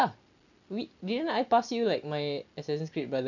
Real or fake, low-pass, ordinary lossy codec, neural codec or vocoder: real; 7.2 kHz; none; none